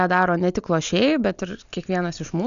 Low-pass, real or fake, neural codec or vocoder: 7.2 kHz; real; none